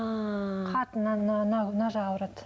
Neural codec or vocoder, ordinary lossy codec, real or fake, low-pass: none; none; real; none